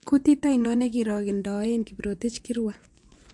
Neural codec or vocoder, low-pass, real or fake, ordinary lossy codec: vocoder, 24 kHz, 100 mel bands, Vocos; 10.8 kHz; fake; MP3, 64 kbps